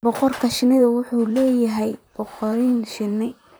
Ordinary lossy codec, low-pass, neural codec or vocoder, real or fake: none; none; vocoder, 44.1 kHz, 128 mel bands, Pupu-Vocoder; fake